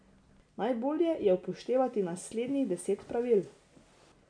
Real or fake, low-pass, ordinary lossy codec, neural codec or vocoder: real; 9.9 kHz; none; none